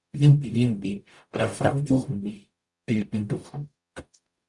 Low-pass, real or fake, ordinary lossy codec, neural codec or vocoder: 10.8 kHz; fake; Opus, 64 kbps; codec, 44.1 kHz, 0.9 kbps, DAC